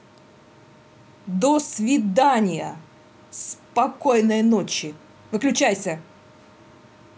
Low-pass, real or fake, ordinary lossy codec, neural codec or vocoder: none; real; none; none